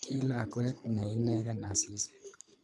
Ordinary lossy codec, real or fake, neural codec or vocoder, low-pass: none; fake; codec, 24 kHz, 3 kbps, HILCodec; none